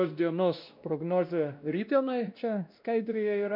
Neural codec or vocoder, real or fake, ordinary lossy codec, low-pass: codec, 16 kHz, 1 kbps, X-Codec, WavLM features, trained on Multilingual LibriSpeech; fake; MP3, 48 kbps; 5.4 kHz